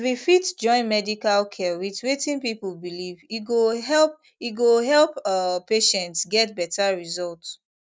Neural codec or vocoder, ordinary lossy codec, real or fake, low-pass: none; none; real; none